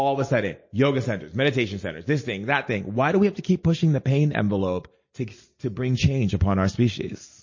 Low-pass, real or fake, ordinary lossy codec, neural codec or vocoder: 7.2 kHz; real; MP3, 32 kbps; none